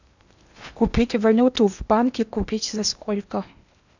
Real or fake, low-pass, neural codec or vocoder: fake; 7.2 kHz; codec, 16 kHz in and 24 kHz out, 0.8 kbps, FocalCodec, streaming, 65536 codes